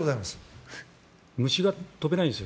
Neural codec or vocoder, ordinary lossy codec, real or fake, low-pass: none; none; real; none